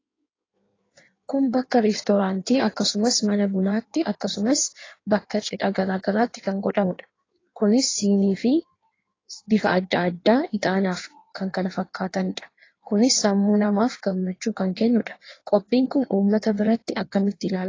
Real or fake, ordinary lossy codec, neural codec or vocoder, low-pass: fake; AAC, 32 kbps; codec, 16 kHz in and 24 kHz out, 1.1 kbps, FireRedTTS-2 codec; 7.2 kHz